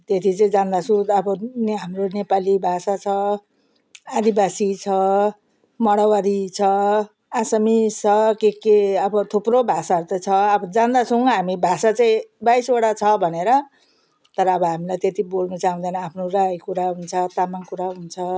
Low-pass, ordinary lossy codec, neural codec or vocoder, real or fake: none; none; none; real